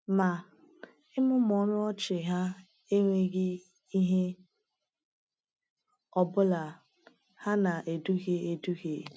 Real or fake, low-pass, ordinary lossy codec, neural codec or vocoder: real; none; none; none